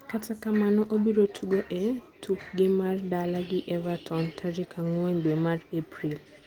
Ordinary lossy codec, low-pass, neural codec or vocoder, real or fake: Opus, 24 kbps; 19.8 kHz; none; real